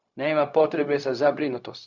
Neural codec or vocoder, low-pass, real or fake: codec, 16 kHz, 0.4 kbps, LongCat-Audio-Codec; 7.2 kHz; fake